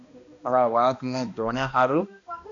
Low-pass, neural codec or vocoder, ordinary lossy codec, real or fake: 7.2 kHz; codec, 16 kHz, 1 kbps, X-Codec, HuBERT features, trained on balanced general audio; AAC, 48 kbps; fake